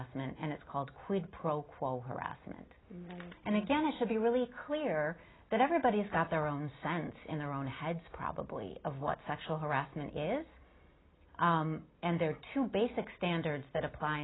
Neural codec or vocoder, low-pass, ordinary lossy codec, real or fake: none; 7.2 kHz; AAC, 16 kbps; real